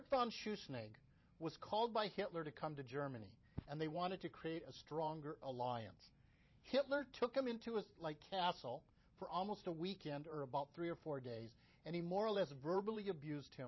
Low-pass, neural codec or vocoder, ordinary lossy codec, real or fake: 7.2 kHz; vocoder, 44.1 kHz, 128 mel bands every 512 samples, BigVGAN v2; MP3, 24 kbps; fake